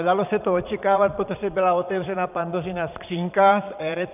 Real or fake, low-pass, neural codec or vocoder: fake; 3.6 kHz; vocoder, 22.05 kHz, 80 mel bands, Vocos